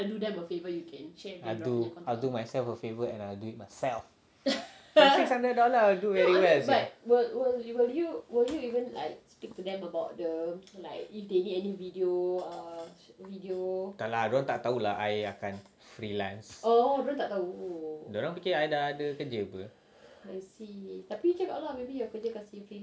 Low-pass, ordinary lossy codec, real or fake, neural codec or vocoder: none; none; real; none